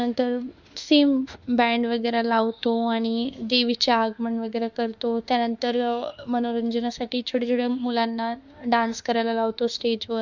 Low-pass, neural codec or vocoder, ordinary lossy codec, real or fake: 7.2 kHz; autoencoder, 48 kHz, 32 numbers a frame, DAC-VAE, trained on Japanese speech; Opus, 64 kbps; fake